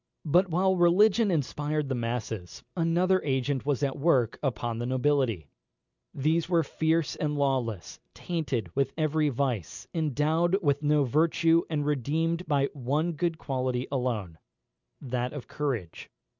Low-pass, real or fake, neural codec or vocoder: 7.2 kHz; real; none